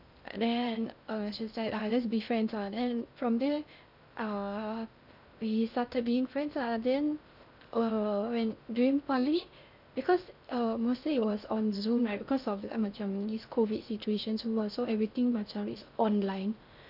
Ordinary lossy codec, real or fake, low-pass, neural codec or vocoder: none; fake; 5.4 kHz; codec, 16 kHz in and 24 kHz out, 0.6 kbps, FocalCodec, streaming, 2048 codes